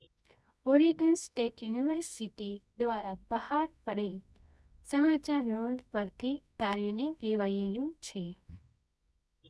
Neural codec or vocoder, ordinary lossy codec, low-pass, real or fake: codec, 24 kHz, 0.9 kbps, WavTokenizer, medium music audio release; none; none; fake